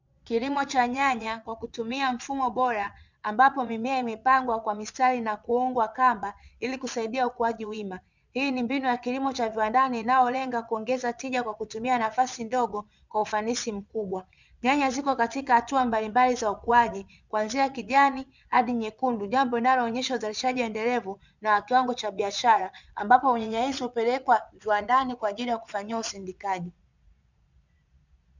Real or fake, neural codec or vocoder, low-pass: fake; vocoder, 22.05 kHz, 80 mel bands, Vocos; 7.2 kHz